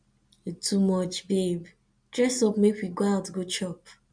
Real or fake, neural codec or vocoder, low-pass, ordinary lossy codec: real; none; 9.9 kHz; MP3, 64 kbps